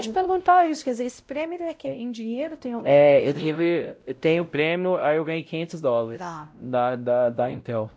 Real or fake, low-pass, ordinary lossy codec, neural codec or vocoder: fake; none; none; codec, 16 kHz, 0.5 kbps, X-Codec, WavLM features, trained on Multilingual LibriSpeech